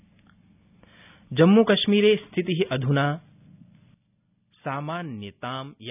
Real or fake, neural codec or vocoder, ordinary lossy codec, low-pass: real; none; none; 3.6 kHz